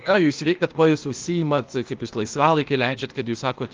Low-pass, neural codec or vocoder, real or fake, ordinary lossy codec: 7.2 kHz; codec, 16 kHz, 0.8 kbps, ZipCodec; fake; Opus, 32 kbps